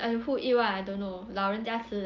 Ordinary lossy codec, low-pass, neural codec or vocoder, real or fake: Opus, 24 kbps; 7.2 kHz; none; real